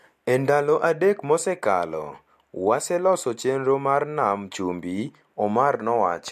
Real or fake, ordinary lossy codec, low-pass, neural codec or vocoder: real; MP3, 64 kbps; 14.4 kHz; none